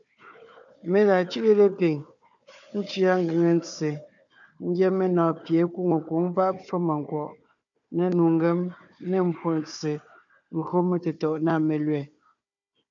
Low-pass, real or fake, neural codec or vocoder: 7.2 kHz; fake; codec, 16 kHz, 4 kbps, FunCodec, trained on Chinese and English, 50 frames a second